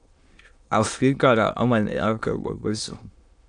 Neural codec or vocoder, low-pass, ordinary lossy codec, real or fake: autoencoder, 22.05 kHz, a latent of 192 numbers a frame, VITS, trained on many speakers; 9.9 kHz; AAC, 64 kbps; fake